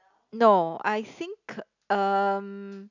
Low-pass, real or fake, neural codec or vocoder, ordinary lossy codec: 7.2 kHz; real; none; none